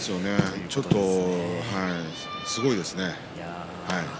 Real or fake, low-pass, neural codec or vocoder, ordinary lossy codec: real; none; none; none